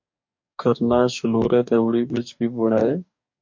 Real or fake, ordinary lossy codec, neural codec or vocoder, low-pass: fake; MP3, 48 kbps; codec, 44.1 kHz, 2.6 kbps, DAC; 7.2 kHz